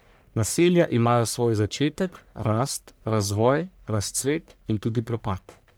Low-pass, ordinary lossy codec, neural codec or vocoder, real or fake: none; none; codec, 44.1 kHz, 1.7 kbps, Pupu-Codec; fake